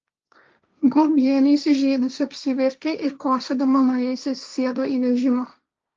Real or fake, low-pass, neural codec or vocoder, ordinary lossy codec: fake; 7.2 kHz; codec, 16 kHz, 1.1 kbps, Voila-Tokenizer; Opus, 24 kbps